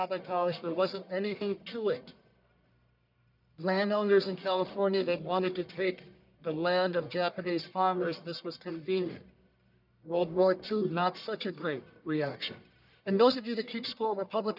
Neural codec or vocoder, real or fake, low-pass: codec, 44.1 kHz, 1.7 kbps, Pupu-Codec; fake; 5.4 kHz